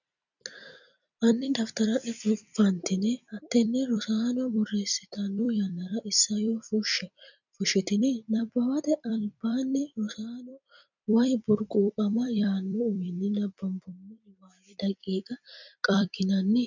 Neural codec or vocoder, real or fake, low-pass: vocoder, 44.1 kHz, 128 mel bands every 256 samples, BigVGAN v2; fake; 7.2 kHz